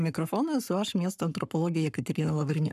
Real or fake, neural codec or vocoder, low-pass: fake; codec, 44.1 kHz, 7.8 kbps, Pupu-Codec; 14.4 kHz